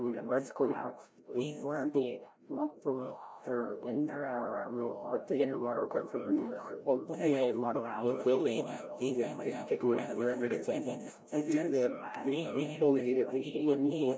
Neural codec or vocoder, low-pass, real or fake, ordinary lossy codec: codec, 16 kHz, 0.5 kbps, FreqCodec, larger model; none; fake; none